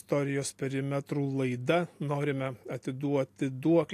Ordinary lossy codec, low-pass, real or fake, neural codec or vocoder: AAC, 64 kbps; 14.4 kHz; real; none